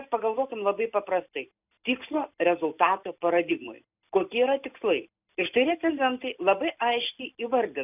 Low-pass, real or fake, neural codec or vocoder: 3.6 kHz; real; none